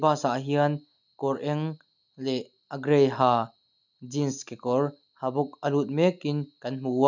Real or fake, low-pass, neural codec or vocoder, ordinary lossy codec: real; 7.2 kHz; none; none